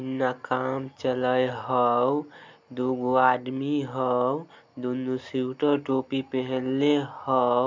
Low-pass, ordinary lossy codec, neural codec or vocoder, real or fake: 7.2 kHz; MP3, 64 kbps; none; real